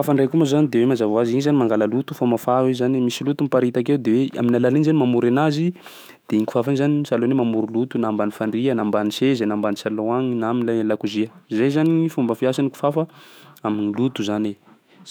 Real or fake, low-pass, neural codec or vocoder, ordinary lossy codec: fake; none; autoencoder, 48 kHz, 128 numbers a frame, DAC-VAE, trained on Japanese speech; none